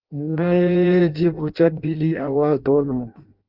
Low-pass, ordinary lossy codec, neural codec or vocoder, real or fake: 5.4 kHz; Opus, 32 kbps; codec, 16 kHz in and 24 kHz out, 0.6 kbps, FireRedTTS-2 codec; fake